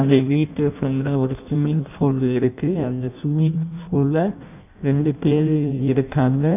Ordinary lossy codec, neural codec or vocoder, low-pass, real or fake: none; codec, 16 kHz in and 24 kHz out, 0.6 kbps, FireRedTTS-2 codec; 3.6 kHz; fake